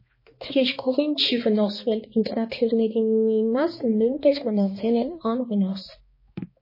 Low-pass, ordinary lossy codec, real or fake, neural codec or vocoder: 5.4 kHz; MP3, 24 kbps; fake; codec, 16 kHz, 2 kbps, X-Codec, HuBERT features, trained on balanced general audio